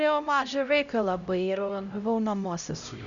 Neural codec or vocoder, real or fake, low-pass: codec, 16 kHz, 0.5 kbps, X-Codec, HuBERT features, trained on LibriSpeech; fake; 7.2 kHz